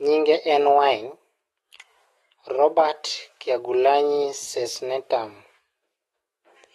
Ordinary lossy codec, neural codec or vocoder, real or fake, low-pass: AAC, 32 kbps; autoencoder, 48 kHz, 128 numbers a frame, DAC-VAE, trained on Japanese speech; fake; 19.8 kHz